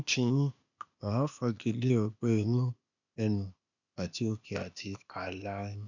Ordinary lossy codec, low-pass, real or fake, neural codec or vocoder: none; 7.2 kHz; fake; codec, 16 kHz, 0.8 kbps, ZipCodec